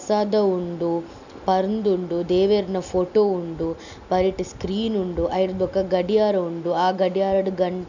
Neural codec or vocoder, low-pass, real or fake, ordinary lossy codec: none; 7.2 kHz; real; none